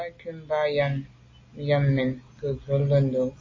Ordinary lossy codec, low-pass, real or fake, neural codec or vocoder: MP3, 32 kbps; 7.2 kHz; real; none